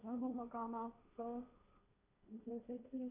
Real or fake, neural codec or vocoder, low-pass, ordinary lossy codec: fake; codec, 16 kHz, 1.1 kbps, Voila-Tokenizer; 3.6 kHz; none